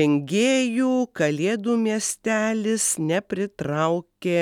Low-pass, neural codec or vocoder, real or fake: 19.8 kHz; none; real